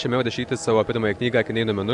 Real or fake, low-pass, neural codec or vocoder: real; 10.8 kHz; none